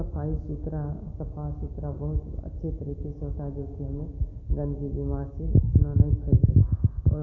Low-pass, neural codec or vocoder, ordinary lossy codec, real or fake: 7.2 kHz; none; none; real